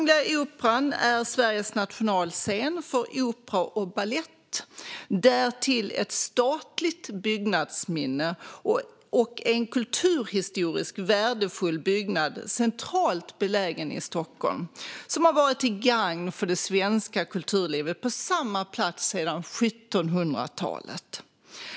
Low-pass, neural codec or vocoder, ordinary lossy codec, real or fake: none; none; none; real